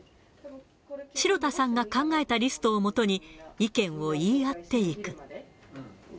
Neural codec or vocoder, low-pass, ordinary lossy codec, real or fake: none; none; none; real